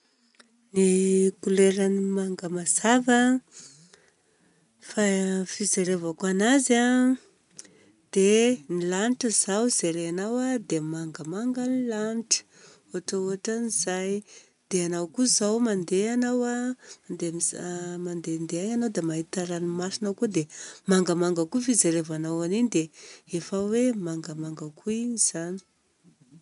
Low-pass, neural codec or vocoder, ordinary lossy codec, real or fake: 10.8 kHz; none; none; real